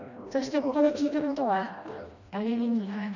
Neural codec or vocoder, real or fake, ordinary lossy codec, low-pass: codec, 16 kHz, 1 kbps, FreqCodec, smaller model; fake; none; 7.2 kHz